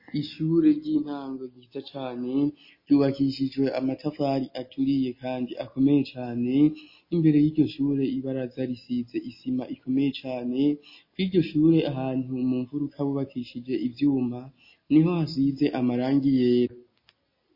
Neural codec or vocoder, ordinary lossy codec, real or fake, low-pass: none; MP3, 24 kbps; real; 5.4 kHz